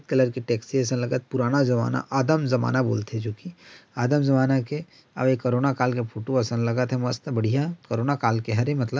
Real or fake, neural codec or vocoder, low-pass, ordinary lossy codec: real; none; none; none